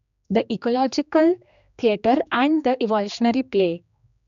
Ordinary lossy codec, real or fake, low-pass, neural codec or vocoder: none; fake; 7.2 kHz; codec, 16 kHz, 2 kbps, X-Codec, HuBERT features, trained on general audio